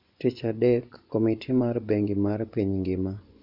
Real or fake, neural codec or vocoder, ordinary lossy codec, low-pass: fake; vocoder, 24 kHz, 100 mel bands, Vocos; none; 5.4 kHz